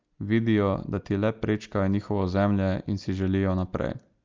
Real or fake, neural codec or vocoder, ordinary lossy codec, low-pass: real; none; Opus, 24 kbps; 7.2 kHz